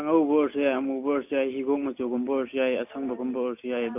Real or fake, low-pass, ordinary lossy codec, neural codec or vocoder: real; 3.6 kHz; MP3, 32 kbps; none